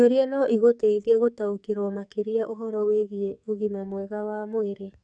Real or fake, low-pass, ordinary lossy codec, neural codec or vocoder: fake; 9.9 kHz; none; codec, 44.1 kHz, 2.6 kbps, SNAC